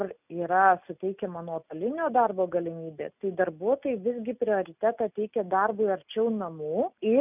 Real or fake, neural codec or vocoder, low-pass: real; none; 3.6 kHz